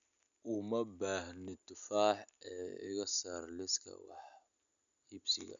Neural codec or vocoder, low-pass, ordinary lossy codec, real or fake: none; 7.2 kHz; none; real